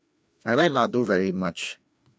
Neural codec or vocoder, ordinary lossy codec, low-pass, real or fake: codec, 16 kHz, 2 kbps, FreqCodec, larger model; none; none; fake